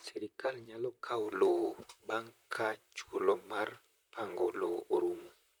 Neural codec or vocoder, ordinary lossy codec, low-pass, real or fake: vocoder, 44.1 kHz, 128 mel bands, Pupu-Vocoder; none; none; fake